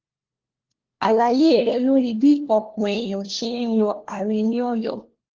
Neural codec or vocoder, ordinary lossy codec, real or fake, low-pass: codec, 16 kHz, 1 kbps, FunCodec, trained on LibriTTS, 50 frames a second; Opus, 16 kbps; fake; 7.2 kHz